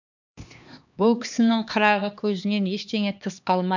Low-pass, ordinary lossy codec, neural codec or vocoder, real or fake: 7.2 kHz; none; codec, 16 kHz, 2 kbps, X-Codec, HuBERT features, trained on LibriSpeech; fake